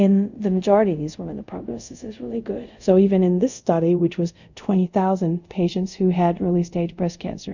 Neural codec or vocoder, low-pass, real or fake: codec, 24 kHz, 0.5 kbps, DualCodec; 7.2 kHz; fake